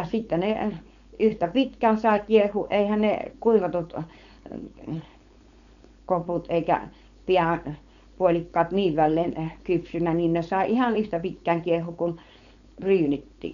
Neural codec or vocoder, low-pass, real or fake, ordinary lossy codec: codec, 16 kHz, 4.8 kbps, FACodec; 7.2 kHz; fake; none